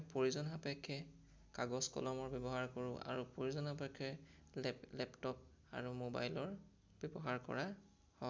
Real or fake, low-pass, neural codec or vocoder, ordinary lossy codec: real; none; none; none